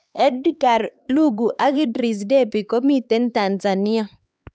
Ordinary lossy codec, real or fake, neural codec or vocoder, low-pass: none; fake; codec, 16 kHz, 4 kbps, X-Codec, HuBERT features, trained on LibriSpeech; none